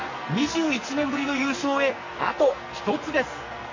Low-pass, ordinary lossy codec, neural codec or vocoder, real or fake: 7.2 kHz; MP3, 32 kbps; codec, 44.1 kHz, 2.6 kbps, SNAC; fake